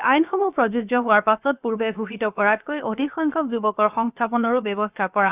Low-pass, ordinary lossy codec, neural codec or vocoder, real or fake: 3.6 kHz; Opus, 64 kbps; codec, 16 kHz, about 1 kbps, DyCAST, with the encoder's durations; fake